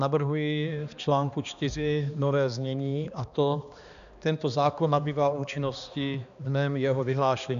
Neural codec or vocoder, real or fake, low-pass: codec, 16 kHz, 2 kbps, X-Codec, HuBERT features, trained on balanced general audio; fake; 7.2 kHz